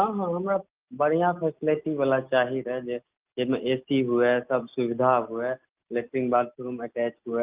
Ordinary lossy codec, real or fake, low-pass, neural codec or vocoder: Opus, 24 kbps; real; 3.6 kHz; none